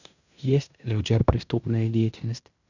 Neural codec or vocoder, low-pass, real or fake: codec, 16 kHz in and 24 kHz out, 0.9 kbps, LongCat-Audio-Codec, fine tuned four codebook decoder; 7.2 kHz; fake